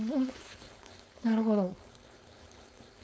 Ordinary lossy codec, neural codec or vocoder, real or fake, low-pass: none; codec, 16 kHz, 4.8 kbps, FACodec; fake; none